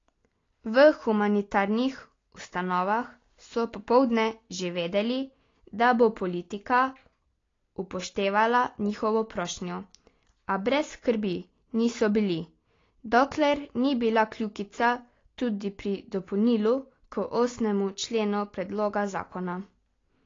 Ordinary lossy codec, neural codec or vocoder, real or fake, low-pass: AAC, 32 kbps; none; real; 7.2 kHz